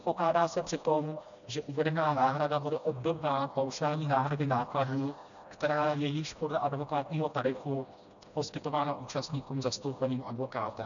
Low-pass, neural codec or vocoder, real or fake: 7.2 kHz; codec, 16 kHz, 1 kbps, FreqCodec, smaller model; fake